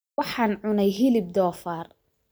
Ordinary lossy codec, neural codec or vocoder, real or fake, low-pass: none; vocoder, 44.1 kHz, 128 mel bands every 512 samples, BigVGAN v2; fake; none